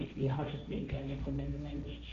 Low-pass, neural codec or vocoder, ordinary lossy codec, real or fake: 7.2 kHz; codec, 16 kHz, 1.1 kbps, Voila-Tokenizer; MP3, 64 kbps; fake